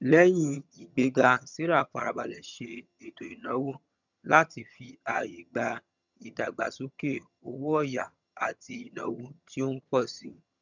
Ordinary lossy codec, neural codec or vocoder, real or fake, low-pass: none; vocoder, 22.05 kHz, 80 mel bands, HiFi-GAN; fake; 7.2 kHz